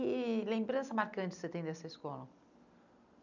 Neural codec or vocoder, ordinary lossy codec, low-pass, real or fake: none; none; 7.2 kHz; real